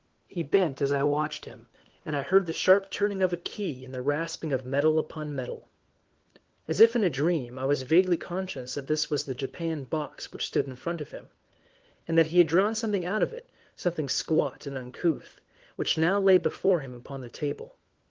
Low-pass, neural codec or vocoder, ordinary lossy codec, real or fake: 7.2 kHz; codec, 16 kHz, 4 kbps, FunCodec, trained on LibriTTS, 50 frames a second; Opus, 16 kbps; fake